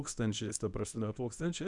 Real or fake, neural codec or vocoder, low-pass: fake; codec, 24 kHz, 0.9 kbps, WavTokenizer, medium speech release version 1; 10.8 kHz